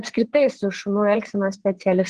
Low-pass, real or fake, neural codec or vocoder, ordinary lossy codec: 9.9 kHz; fake; vocoder, 22.05 kHz, 80 mel bands, WaveNeXt; Opus, 16 kbps